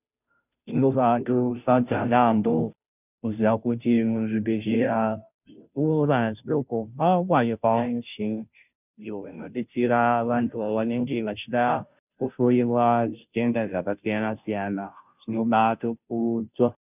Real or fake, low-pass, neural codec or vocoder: fake; 3.6 kHz; codec, 16 kHz, 0.5 kbps, FunCodec, trained on Chinese and English, 25 frames a second